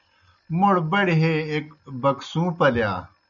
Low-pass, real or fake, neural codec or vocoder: 7.2 kHz; real; none